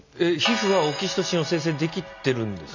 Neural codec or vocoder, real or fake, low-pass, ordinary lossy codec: none; real; 7.2 kHz; none